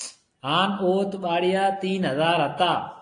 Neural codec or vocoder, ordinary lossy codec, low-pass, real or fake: none; AAC, 48 kbps; 9.9 kHz; real